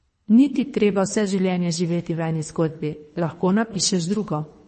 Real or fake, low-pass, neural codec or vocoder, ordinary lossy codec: fake; 10.8 kHz; codec, 24 kHz, 3 kbps, HILCodec; MP3, 32 kbps